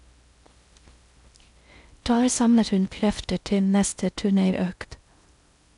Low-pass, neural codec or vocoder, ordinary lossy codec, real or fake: 10.8 kHz; codec, 16 kHz in and 24 kHz out, 0.6 kbps, FocalCodec, streaming, 4096 codes; none; fake